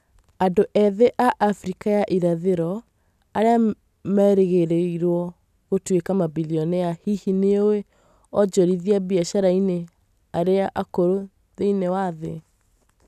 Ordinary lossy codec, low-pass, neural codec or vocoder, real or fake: none; 14.4 kHz; none; real